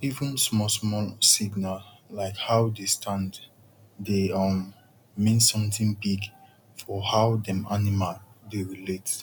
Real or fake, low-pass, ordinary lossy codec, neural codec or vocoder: real; 19.8 kHz; none; none